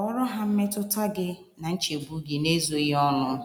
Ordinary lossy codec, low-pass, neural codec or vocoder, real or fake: none; none; none; real